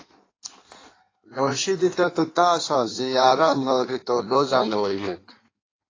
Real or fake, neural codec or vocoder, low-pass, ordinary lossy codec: fake; codec, 16 kHz in and 24 kHz out, 1.1 kbps, FireRedTTS-2 codec; 7.2 kHz; AAC, 32 kbps